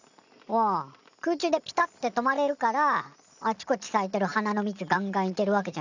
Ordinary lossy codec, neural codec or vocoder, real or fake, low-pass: none; none; real; 7.2 kHz